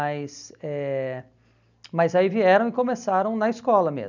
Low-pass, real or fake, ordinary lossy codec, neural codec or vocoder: 7.2 kHz; real; none; none